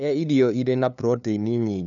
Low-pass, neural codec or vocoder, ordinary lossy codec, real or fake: 7.2 kHz; codec, 16 kHz, 2 kbps, FunCodec, trained on LibriTTS, 25 frames a second; none; fake